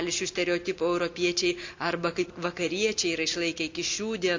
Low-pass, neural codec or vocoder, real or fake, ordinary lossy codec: 7.2 kHz; none; real; MP3, 64 kbps